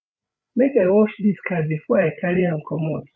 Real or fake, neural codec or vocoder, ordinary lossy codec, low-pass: fake; codec, 16 kHz, 8 kbps, FreqCodec, larger model; none; none